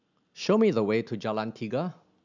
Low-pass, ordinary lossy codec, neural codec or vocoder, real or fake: 7.2 kHz; none; none; real